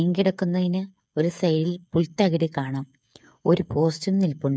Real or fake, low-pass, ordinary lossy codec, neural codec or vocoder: fake; none; none; codec, 16 kHz, 8 kbps, FreqCodec, smaller model